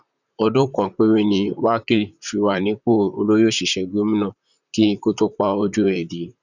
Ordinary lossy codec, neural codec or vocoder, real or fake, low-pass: none; vocoder, 44.1 kHz, 128 mel bands, Pupu-Vocoder; fake; 7.2 kHz